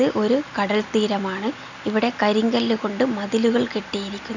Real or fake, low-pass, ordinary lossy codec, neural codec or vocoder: real; 7.2 kHz; none; none